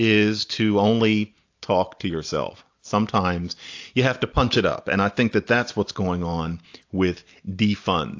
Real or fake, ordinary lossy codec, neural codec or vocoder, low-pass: real; AAC, 48 kbps; none; 7.2 kHz